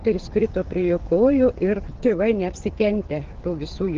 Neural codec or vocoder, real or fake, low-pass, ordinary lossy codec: codec, 16 kHz, 8 kbps, FreqCodec, smaller model; fake; 7.2 kHz; Opus, 16 kbps